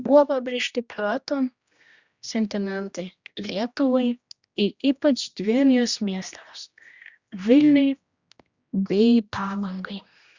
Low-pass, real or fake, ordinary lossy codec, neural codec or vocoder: 7.2 kHz; fake; Opus, 64 kbps; codec, 16 kHz, 1 kbps, X-Codec, HuBERT features, trained on general audio